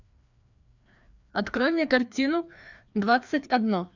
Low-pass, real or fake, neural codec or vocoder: 7.2 kHz; fake; codec, 16 kHz, 2 kbps, FreqCodec, larger model